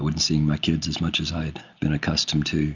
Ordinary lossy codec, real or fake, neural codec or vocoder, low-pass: Opus, 64 kbps; real; none; 7.2 kHz